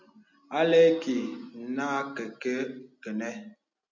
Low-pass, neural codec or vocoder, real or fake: 7.2 kHz; none; real